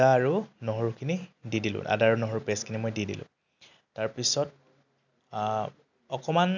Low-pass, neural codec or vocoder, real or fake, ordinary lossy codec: 7.2 kHz; none; real; none